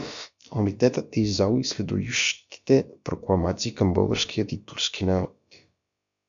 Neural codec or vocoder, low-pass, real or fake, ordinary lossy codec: codec, 16 kHz, about 1 kbps, DyCAST, with the encoder's durations; 7.2 kHz; fake; MP3, 64 kbps